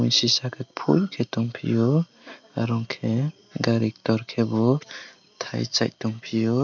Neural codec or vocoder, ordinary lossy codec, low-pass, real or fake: none; none; 7.2 kHz; real